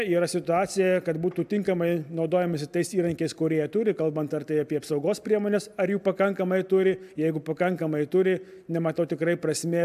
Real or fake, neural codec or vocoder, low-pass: real; none; 14.4 kHz